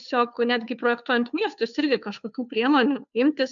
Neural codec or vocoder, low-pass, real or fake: codec, 16 kHz, 2 kbps, FunCodec, trained on Chinese and English, 25 frames a second; 7.2 kHz; fake